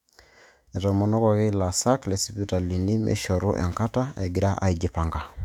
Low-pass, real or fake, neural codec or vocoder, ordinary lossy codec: 19.8 kHz; fake; autoencoder, 48 kHz, 128 numbers a frame, DAC-VAE, trained on Japanese speech; none